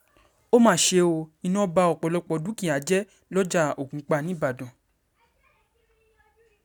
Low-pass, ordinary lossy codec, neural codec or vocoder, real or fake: none; none; none; real